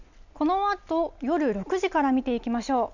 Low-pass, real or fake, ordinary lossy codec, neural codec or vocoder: 7.2 kHz; real; none; none